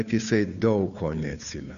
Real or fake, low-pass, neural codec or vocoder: fake; 7.2 kHz; codec, 16 kHz, 2 kbps, FunCodec, trained on Chinese and English, 25 frames a second